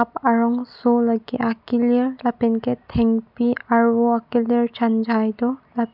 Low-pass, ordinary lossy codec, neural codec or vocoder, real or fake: 5.4 kHz; none; none; real